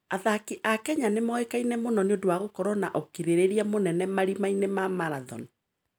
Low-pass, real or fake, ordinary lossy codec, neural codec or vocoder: none; real; none; none